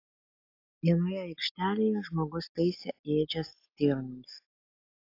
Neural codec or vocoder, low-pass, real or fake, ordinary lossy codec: none; 5.4 kHz; real; AAC, 32 kbps